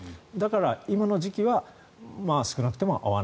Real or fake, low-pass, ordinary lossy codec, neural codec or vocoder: real; none; none; none